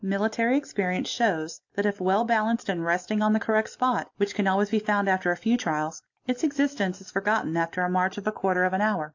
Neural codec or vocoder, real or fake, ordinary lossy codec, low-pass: none; real; AAC, 48 kbps; 7.2 kHz